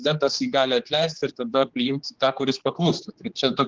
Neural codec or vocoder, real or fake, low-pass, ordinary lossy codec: codec, 16 kHz, 4 kbps, X-Codec, HuBERT features, trained on general audio; fake; 7.2 kHz; Opus, 16 kbps